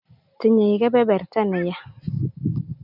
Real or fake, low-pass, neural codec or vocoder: real; 5.4 kHz; none